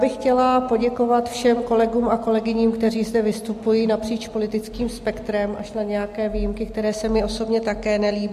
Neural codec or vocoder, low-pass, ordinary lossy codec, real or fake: none; 14.4 kHz; MP3, 64 kbps; real